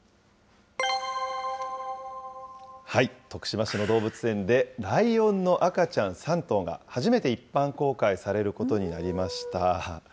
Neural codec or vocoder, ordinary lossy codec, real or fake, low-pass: none; none; real; none